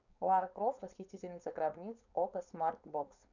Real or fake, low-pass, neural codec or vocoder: fake; 7.2 kHz; codec, 16 kHz, 4.8 kbps, FACodec